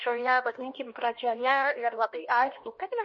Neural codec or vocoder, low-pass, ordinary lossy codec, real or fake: codec, 16 kHz, 1 kbps, X-Codec, HuBERT features, trained on LibriSpeech; 7.2 kHz; MP3, 32 kbps; fake